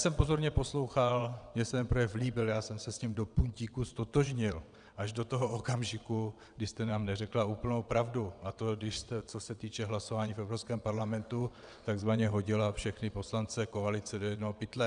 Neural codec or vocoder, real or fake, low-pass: vocoder, 22.05 kHz, 80 mel bands, WaveNeXt; fake; 9.9 kHz